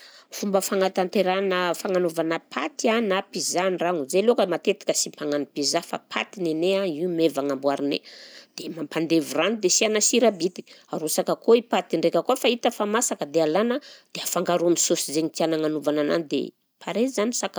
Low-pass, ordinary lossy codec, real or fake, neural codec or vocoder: none; none; real; none